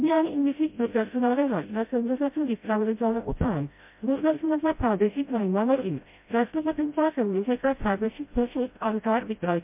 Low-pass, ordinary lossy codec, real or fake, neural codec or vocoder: 3.6 kHz; MP3, 32 kbps; fake; codec, 16 kHz, 0.5 kbps, FreqCodec, smaller model